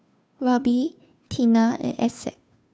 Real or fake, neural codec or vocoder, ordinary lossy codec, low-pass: fake; codec, 16 kHz, 2 kbps, FunCodec, trained on Chinese and English, 25 frames a second; none; none